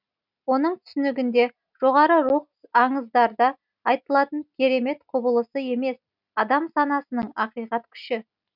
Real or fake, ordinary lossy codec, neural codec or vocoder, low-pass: real; none; none; 5.4 kHz